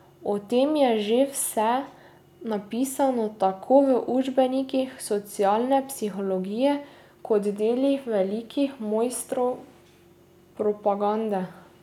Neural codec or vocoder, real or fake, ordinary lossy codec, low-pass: none; real; none; 19.8 kHz